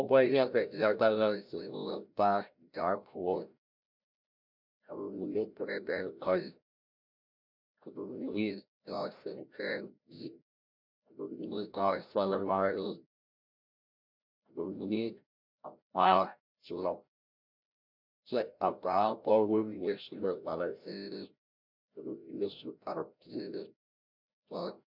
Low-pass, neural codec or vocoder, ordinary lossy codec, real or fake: 5.4 kHz; codec, 16 kHz, 0.5 kbps, FreqCodec, larger model; MP3, 48 kbps; fake